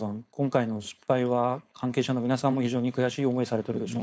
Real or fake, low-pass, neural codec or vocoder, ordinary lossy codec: fake; none; codec, 16 kHz, 4.8 kbps, FACodec; none